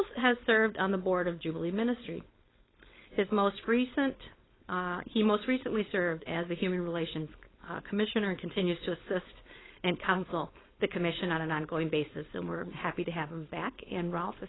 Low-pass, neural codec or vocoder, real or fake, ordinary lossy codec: 7.2 kHz; codec, 16 kHz, 4.8 kbps, FACodec; fake; AAC, 16 kbps